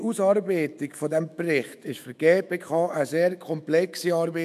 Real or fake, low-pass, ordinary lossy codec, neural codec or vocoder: fake; 14.4 kHz; none; autoencoder, 48 kHz, 128 numbers a frame, DAC-VAE, trained on Japanese speech